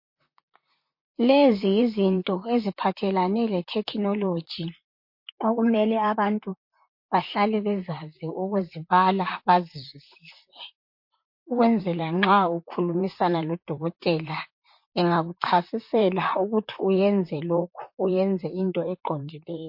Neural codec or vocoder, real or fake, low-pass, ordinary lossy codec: vocoder, 44.1 kHz, 128 mel bands, Pupu-Vocoder; fake; 5.4 kHz; MP3, 32 kbps